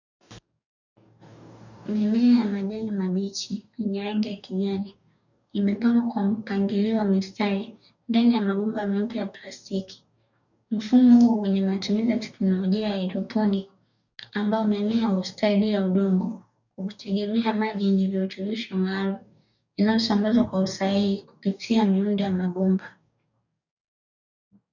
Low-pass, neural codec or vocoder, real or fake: 7.2 kHz; codec, 44.1 kHz, 2.6 kbps, DAC; fake